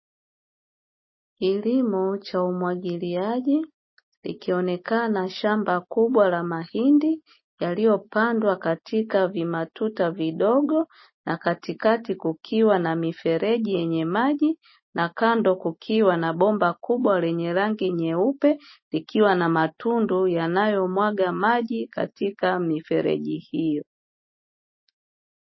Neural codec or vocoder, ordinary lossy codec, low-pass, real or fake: none; MP3, 24 kbps; 7.2 kHz; real